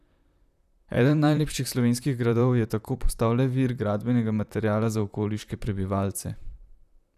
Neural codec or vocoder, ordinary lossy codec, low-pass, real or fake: vocoder, 44.1 kHz, 128 mel bands, Pupu-Vocoder; none; 14.4 kHz; fake